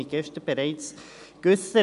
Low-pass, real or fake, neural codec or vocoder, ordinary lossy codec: 10.8 kHz; real; none; none